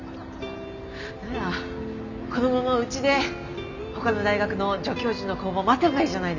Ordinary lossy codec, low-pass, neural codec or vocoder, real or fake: none; 7.2 kHz; none; real